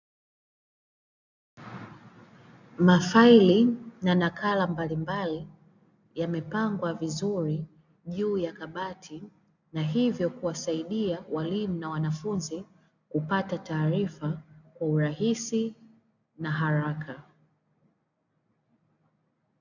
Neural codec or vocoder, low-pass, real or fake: none; 7.2 kHz; real